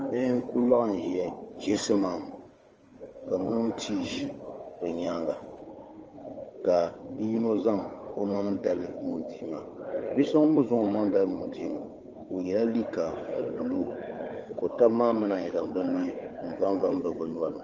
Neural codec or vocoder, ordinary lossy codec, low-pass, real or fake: codec, 16 kHz, 4 kbps, FunCodec, trained on Chinese and English, 50 frames a second; Opus, 24 kbps; 7.2 kHz; fake